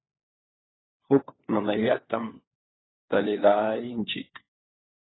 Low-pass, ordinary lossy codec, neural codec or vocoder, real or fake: 7.2 kHz; AAC, 16 kbps; codec, 16 kHz, 4 kbps, FunCodec, trained on LibriTTS, 50 frames a second; fake